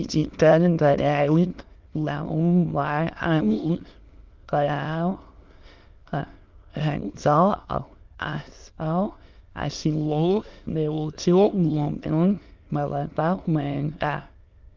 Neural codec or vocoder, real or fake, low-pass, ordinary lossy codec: autoencoder, 22.05 kHz, a latent of 192 numbers a frame, VITS, trained on many speakers; fake; 7.2 kHz; Opus, 32 kbps